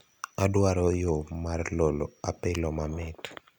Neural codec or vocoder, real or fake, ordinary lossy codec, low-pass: none; real; none; 19.8 kHz